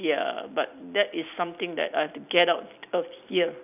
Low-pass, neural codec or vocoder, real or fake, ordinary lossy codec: 3.6 kHz; none; real; none